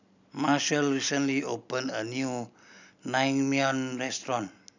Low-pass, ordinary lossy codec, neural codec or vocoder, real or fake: 7.2 kHz; none; none; real